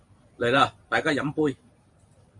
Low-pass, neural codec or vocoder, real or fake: 10.8 kHz; vocoder, 44.1 kHz, 128 mel bands every 512 samples, BigVGAN v2; fake